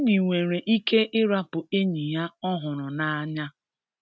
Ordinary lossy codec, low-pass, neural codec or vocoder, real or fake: none; none; none; real